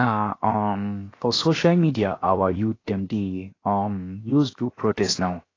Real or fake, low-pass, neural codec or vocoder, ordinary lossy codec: fake; 7.2 kHz; codec, 16 kHz, 0.7 kbps, FocalCodec; AAC, 32 kbps